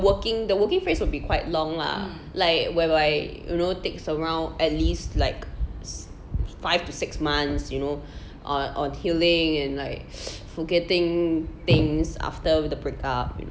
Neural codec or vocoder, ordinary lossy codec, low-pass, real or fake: none; none; none; real